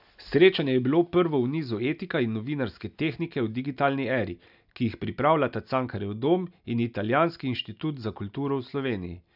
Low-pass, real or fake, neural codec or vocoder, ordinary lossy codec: 5.4 kHz; real; none; none